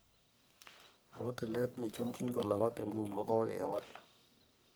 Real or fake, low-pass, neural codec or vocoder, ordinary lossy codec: fake; none; codec, 44.1 kHz, 1.7 kbps, Pupu-Codec; none